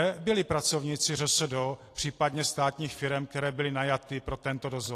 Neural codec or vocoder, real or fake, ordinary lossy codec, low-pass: vocoder, 44.1 kHz, 128 mel bands every 256 samples, BigVGAN v2; fake; AAC, 48 kbps; 14.4 kHz